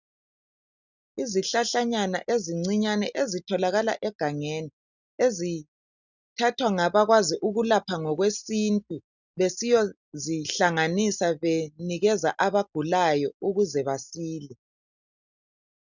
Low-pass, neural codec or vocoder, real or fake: 7.2 kHz; none; real